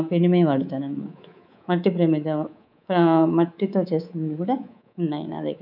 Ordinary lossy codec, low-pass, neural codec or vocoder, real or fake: none; 5.4 kHz; codec, 24 kHz, 3.1 kbps, DualCodec; fake